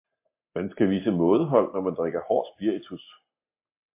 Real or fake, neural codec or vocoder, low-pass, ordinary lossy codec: real; none; 3.6 kHz; MP3, 24 kbps